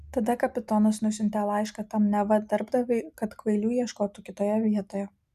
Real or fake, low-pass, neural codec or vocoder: real; 14.4 kHz; none